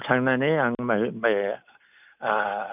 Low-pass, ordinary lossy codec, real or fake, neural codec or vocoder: 3.6 kHz; none; real; none